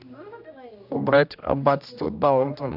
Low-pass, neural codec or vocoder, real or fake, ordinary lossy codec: 5.4 kHz; codec, 16 kHz, 1 kbps, X-Codec, HuBERT features, trained on general audio; fake; none